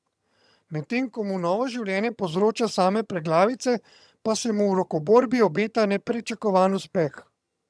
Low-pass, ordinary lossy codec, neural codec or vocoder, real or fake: none; none; vocoder, 22.05 kHz, 80 mel bands, HiFi-GAN; fake